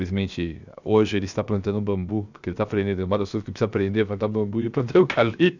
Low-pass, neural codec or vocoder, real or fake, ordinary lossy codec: 7.2 kHz; codec, 16 kHz, 0.7 kbps, FocalCodec; fake; none